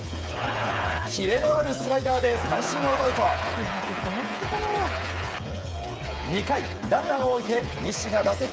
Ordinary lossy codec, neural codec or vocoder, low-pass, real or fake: none; codec, 16 kHz, 8 kbps, FreqCodec, smaller model; none; fake